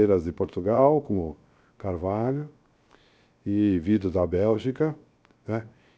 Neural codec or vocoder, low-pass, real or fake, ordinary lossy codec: codec, 16 kHz, 0.7 kbps, FocalCodec; none; fake; none